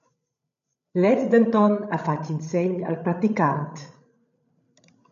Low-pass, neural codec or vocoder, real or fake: 7.2 kHz; codec, 16 kHz, 16 kbps, FreqCodec, larger model; fake